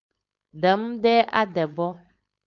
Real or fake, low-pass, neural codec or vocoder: fake; 7.2 kHz; codec, 16 kHz, 4.8 kbps, FACodec